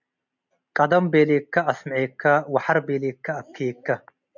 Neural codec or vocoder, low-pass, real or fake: none; 7.2 kHz; real